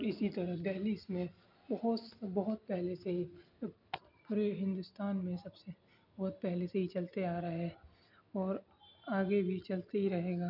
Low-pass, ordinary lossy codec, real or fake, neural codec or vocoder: 5.4 kHz; none; real; none